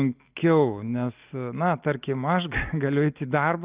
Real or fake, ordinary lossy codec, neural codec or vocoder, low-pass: real; Opus, 24 kbps; none; 3.6 kHz